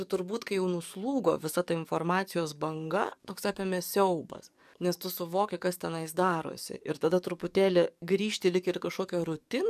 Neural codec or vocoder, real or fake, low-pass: codec, 44.1 kHz, 7.8 kbps, DAC; fake; 14.4 kHz